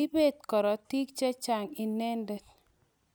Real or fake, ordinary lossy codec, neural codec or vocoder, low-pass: real; none; none; none